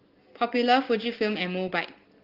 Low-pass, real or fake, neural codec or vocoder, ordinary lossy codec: 5.4 kHz; real; none; Opus, 16 kbps